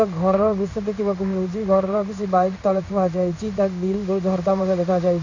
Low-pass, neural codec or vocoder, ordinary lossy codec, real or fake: 7.2 kHz; codec, 16 kHz in and 24 kHz out, 1 kbps, XY-Tokenizer; none; fake